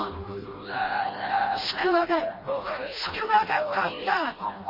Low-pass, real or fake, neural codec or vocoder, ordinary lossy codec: 5.4 kHz; fake; codec, 16 kHz, 1 kbps, FreqCodec, smaller model; MP3, 24 kbps